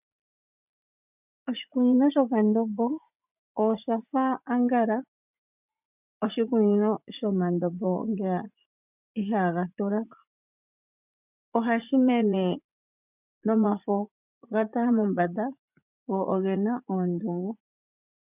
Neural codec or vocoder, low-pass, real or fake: vocoder, 44.1 kHz, 80 mel bands, Vocos; 3.6 kHz; fake